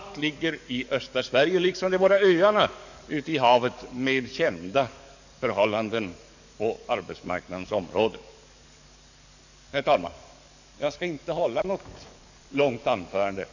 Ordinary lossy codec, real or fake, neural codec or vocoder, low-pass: none; fake; codec, 44.1 kHz, 7.8 kbps, DAC; 7.2 kHz